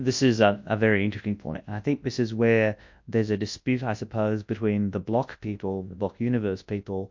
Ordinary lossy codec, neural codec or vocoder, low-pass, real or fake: MP3, 48 kbps; codec, 24 kHz, 0.9 kbps, WavTokenizer, large speech release; 7.2 kHz; fake